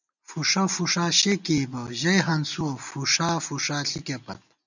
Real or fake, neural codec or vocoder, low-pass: real; none; 7.2 kHz